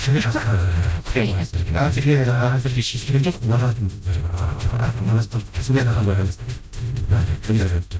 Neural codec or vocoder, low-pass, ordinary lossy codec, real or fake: codec, 16 kHz, 0.5 kbps, FreqCodec, smaller model; none; none; fake